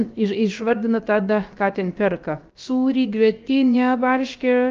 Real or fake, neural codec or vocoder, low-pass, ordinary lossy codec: fake; codec, 16 kHz, about 1 kbps, DyCAST, with the encoder's durations; 7.2 kHz; Opus, 24 kbps